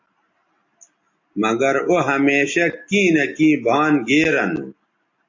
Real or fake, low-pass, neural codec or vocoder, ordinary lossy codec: real; 7.2 kHz; none; AAC, 48 kbps